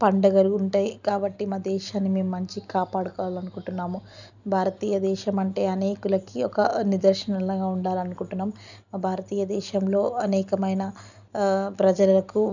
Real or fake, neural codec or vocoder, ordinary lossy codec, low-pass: real; none; none; 7.2 kHz